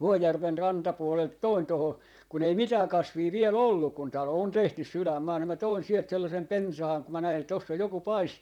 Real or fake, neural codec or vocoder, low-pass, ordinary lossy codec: fake; vocoder, 44.1 kHz, 128 mel bands, Pupu-Vocoder; 19.8 kHz; none